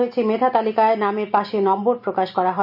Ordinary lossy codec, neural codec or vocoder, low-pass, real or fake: none; none; 5.4 kHz; real